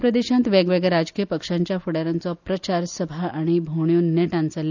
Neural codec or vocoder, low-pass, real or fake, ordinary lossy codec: none; 7.2 kHz; real; none